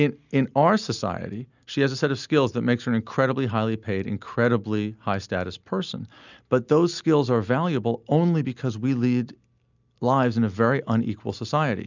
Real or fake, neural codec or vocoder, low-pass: real; none; 7.2 kHz